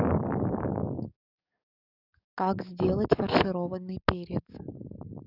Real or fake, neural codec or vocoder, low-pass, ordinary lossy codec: fake; codec, 44.1 kHz, 7.8 kbps, DAC; 5.4 kHz; none